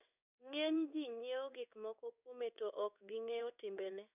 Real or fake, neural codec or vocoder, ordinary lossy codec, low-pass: fake; codec, 16 kHz in and 24 kHz out, 1 kbps, XY-Tokenizer; none; 3.6 kHz